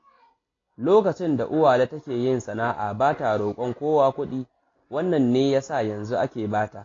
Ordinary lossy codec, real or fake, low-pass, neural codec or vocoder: AAC, 32 kbps; real; 7.2 kHz; none